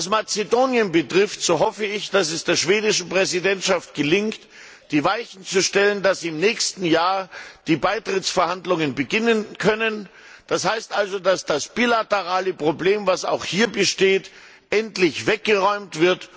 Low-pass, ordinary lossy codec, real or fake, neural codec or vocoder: none; none; real; none